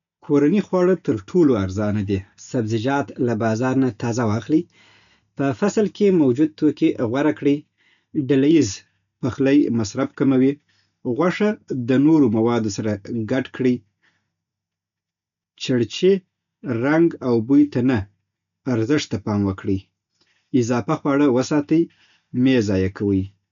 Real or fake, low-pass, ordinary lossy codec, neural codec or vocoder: real; 7.2 kHz; none; none